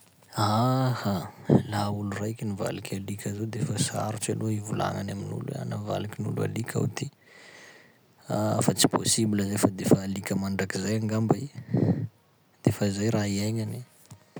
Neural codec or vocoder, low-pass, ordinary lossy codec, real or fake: none; none; none; real